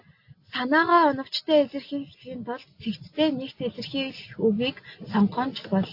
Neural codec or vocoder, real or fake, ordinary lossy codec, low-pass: none; real; AAC, 32 kbps; 5.4 kHz